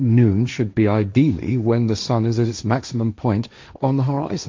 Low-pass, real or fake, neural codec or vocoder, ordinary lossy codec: 7.2 kHz; fake; codec, 16 kHz, 1.1 kbps, Voila-Tokenizer; MP3, 64 kbps